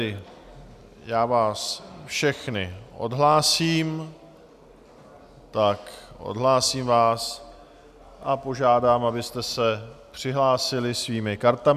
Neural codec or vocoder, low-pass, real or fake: none; 14.4 kHz; real